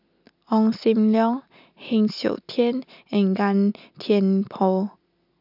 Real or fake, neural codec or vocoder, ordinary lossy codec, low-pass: real; none; none; 5.4 kHz